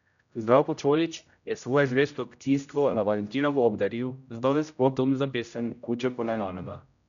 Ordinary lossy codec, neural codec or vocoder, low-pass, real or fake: none; codec, 16 kHz, 0.5 kbps, X-Codec, HuBERT features, trained on general audio; 7.2 kHz; fake